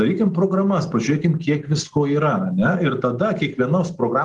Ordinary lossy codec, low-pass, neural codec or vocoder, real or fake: Opus, 24 kbps; 10.8 kHz; none; real